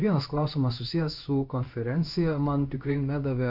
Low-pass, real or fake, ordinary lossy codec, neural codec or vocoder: 5.4 kHz; fake; AAC, 48 kbps; codec, 16 kHz in and 24 kHz out, 1 kbps, XY-Tokenizer